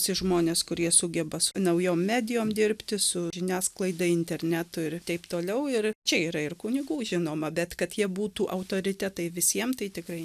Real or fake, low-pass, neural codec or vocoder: real; 14.4 kHz; none